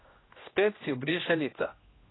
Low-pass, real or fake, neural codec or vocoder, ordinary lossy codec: 7.2 kHz; fake; codec, 16 kHz, 1 kbps, X-Codec, HuBERT features, trained on general audio; AAC, 16 kbps